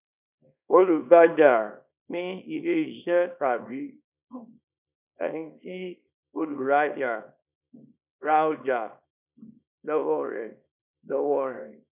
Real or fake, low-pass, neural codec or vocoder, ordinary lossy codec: fake; 3.6 kHz; codec, 24 kHz, 0.9 kbps, WavTokenizer, small release; none